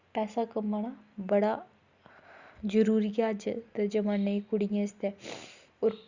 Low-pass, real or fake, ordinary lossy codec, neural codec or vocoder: 7.2 kHz; real; Opus, 64 kbps; none